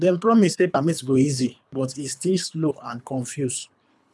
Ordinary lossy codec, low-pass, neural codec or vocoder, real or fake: none; none; codec, 24 kHz, 3 kbps, HILCodec; fake